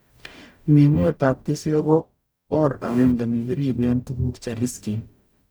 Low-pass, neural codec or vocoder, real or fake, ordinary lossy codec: none; codec, 44.1 kHz, 0.9 kbps, DAC; fake; none